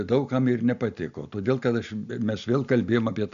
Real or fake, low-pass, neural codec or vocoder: real; 7.2 kHz; none